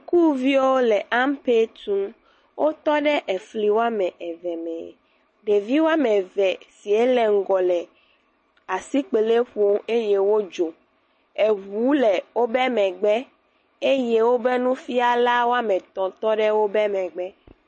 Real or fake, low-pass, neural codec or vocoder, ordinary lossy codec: real; 10.8 kHz; none; MP3, 32 kbps